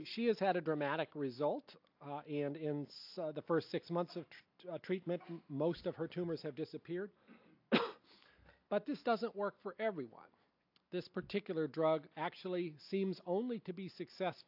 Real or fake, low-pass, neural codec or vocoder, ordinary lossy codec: real; 5.4 kHz; none; AAC, 48 kbps